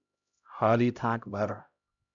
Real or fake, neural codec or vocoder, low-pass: fake; codec, 16 kHz, 0.5 kbps, X-Codec, HuBERT features, trained on LibriSpeech; 7.2 kHz